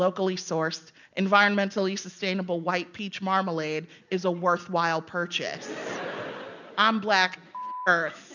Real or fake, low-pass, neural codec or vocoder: real; 7.2 kHz; none